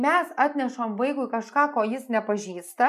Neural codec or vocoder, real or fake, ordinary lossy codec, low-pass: none; real; MP3, 96 kbps; 14.4 kHz